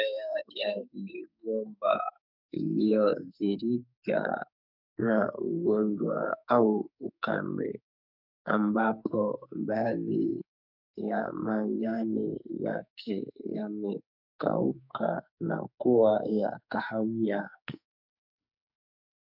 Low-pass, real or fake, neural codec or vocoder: 5.4 kHz; fake; codec, 44.1 kHz, 2.6 kbps, SNAC